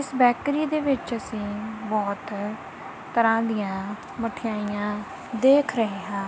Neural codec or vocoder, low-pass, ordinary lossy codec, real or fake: none; none; none; real